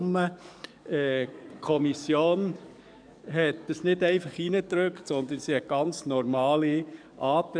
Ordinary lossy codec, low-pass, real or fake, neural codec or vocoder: none; 9.9 kHz; fake; codec, 44.1 kHz, 7.8 kbps, DAC